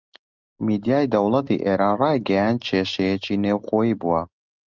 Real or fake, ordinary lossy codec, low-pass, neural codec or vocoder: real; Opus, 32 kbps; 7.2 kHz; none